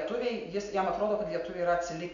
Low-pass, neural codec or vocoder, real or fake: 7.2 kHz; none; real